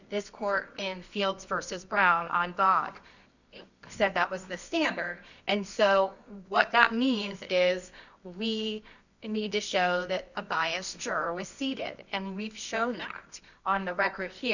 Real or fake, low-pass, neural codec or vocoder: fake; 7.2 kHz; codec, 24 kHz, 0.9 kbps, WavTokenizer, medium music audio release